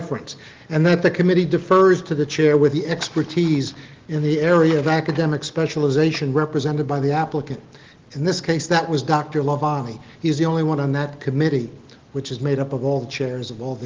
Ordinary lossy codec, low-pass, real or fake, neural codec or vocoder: Opus, 16 kbps; 7.2 kHz; real; none